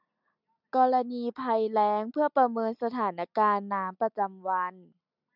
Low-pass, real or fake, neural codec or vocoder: 5.4 kHz; real; none